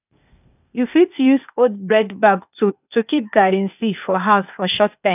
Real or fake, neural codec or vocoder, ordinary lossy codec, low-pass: fake; codec, 16 kHz, 0.8 kbps, ZipCodec; none; 3.6 kHz